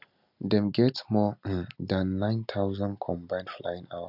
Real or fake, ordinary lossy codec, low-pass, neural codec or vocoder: real; none; 5.4 kHz; none